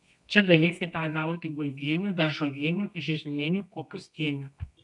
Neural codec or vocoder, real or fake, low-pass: codec, 24 kHz, 0.9 kbps, WavTokenizer, medium music audio release; fake; 10.8 kHz